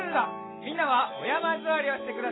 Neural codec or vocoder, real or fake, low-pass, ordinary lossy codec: none; real; 7.2 kHz; AAC, 16 kbps